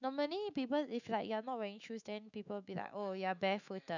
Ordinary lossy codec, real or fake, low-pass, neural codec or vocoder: none; fake; 7.2 kHz; autoencoder, 48 kHz, 128 numbers a frame, DAC-VAE, trained on Japanese speech